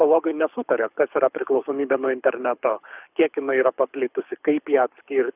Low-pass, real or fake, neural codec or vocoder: 3.6 kHz; fake; codec, 24 kHz, 6 kbps, HILCodec